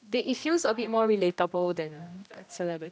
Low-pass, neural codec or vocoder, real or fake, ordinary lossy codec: none; codec, 16 kHz, 1 kbps, X-Codec, HuBERT features, trained on general audio; fake; none